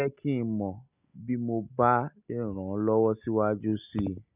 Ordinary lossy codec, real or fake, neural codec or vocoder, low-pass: none; real; none; 3.6 kHz